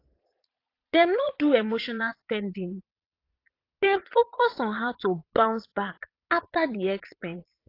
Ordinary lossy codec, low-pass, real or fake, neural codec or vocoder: AAC, 32 kbps; 5.4 kHz; fake; vocoder, 22.05 kHz, 80 mel bands, Vocos